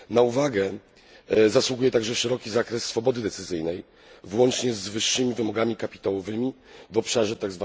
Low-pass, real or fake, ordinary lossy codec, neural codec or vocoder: none; real; none; none